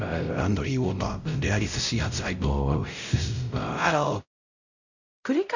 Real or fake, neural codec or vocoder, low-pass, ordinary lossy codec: fake; codec, 16 kHz, 0.5 kbps, X-Codec, WavLM features, trained on Multilingual LibriSpeech; 7.2 kHz; none